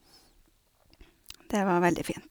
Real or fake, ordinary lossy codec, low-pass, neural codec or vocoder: real; none; none; none